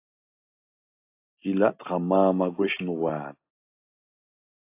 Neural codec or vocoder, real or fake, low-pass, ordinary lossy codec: none; real; 3.6 kHz; AAC, 24 kbps